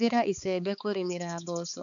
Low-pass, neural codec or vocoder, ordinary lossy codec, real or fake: 7.2 kHz; codec, 16 kHz, 4 kbps, X-Codec, HuBERT features, trained on balanced general audio; none; fake